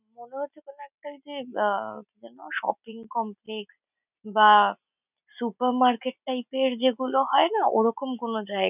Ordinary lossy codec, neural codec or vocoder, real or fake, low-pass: none; none; real; 3.6 kHz